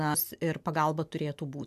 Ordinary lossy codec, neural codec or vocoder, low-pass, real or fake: AAC, 96 kbps; none; 14.4 kHz; real